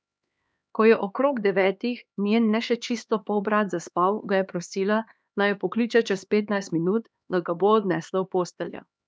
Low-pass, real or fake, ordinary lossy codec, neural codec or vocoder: none; fake; none; codec, 16 kHz, 2 kbps, X-Codec, HuBERT features, trained on LibriSpeech